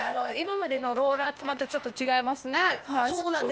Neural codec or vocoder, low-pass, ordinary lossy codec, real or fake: codec, 16 kHz, 0.8 kbps, ZipCodec; none; none; fake